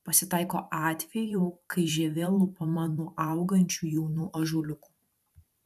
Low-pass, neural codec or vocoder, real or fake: 14.4 kHz; vocoder, 44.1 kHz, 128 mel bands every 512 samples, BigVGAN v2; fake